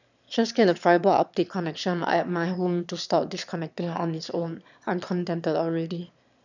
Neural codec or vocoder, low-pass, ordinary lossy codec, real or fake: autoencoder, 22.05 kHz, a latent of 192 numbers a frame, VITS, trained on one speaker; 7.2 kHz; none; fake